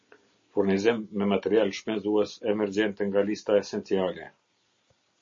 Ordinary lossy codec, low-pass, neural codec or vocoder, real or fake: MP3, 32 kbps; 7.2 kHz; none; real